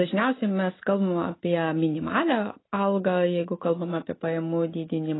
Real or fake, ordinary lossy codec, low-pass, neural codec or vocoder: real; AAC, 16 kbps; 7.2 kHz; none